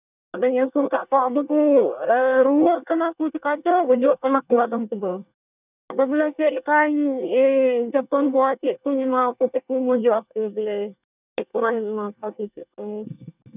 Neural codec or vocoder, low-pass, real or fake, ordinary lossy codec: codec, 24 kHz, 1 kbps, SNAC; 3.6 kHz; fake; none